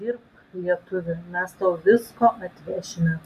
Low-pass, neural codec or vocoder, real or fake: 14.4 kHz; none; real